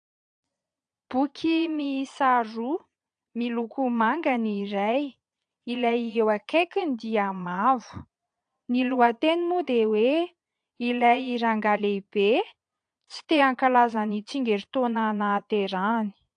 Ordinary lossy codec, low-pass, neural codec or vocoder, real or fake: AAC, 64 kbps; 9.9 kHz; vocoder, 22.05 kHz, 80 mel bands, Vocos; fake